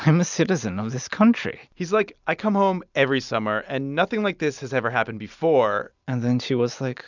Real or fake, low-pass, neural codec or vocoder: real; 7.2 kHz; none